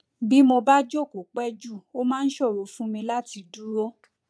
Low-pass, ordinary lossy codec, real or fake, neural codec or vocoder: none; none; fake; vocoder, 22.05 kHz, 80 mel bands, Vocos